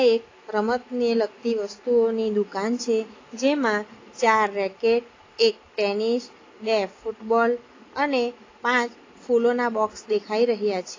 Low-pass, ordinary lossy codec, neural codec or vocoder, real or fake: 7.2 kHz; AAC, 32 kbps; none; real